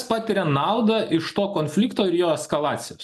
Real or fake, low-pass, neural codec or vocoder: real; 14.4 kHz; none